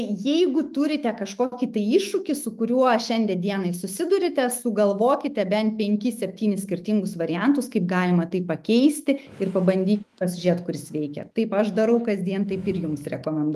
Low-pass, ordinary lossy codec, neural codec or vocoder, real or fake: 14.4 kHz; Opus, 32 kbps; autoencoder, 48 kHz, 128 numbers a frame, DAC-VAE, trained on Japanese speech; fake